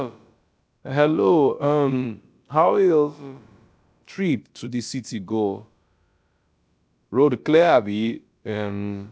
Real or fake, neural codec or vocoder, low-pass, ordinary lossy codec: fake; codec, 16 kHz, about 1 kbps, DyCAST, with the encoder's durations; none; none